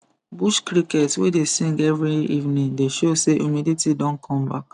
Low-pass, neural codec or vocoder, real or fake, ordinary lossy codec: 10.8 kHz; none; real; none